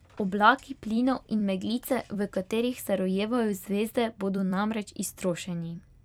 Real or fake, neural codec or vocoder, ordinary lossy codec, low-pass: fake; vocoder, 44.1 kHz, 128 mel bands every 256 samples, BigVGAN v2; none; 19.8 kHz